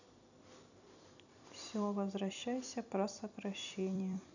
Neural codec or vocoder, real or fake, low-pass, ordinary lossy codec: vocoder, 44.1 kHz, 128 mel bands every 256 samples, BigVGAN v2; fake; 7.2 kHz; none